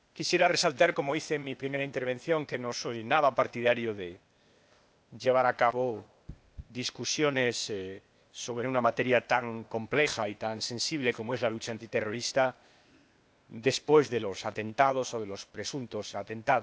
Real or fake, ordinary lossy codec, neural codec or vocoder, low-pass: fake; none; codec, 16 kHz, 0.8 kbps, ZipCodec; none